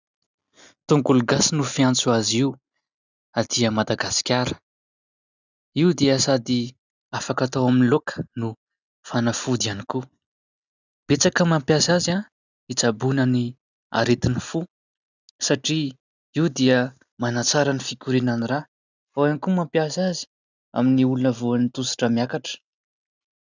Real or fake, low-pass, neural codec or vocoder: real; 7.2 kHz; none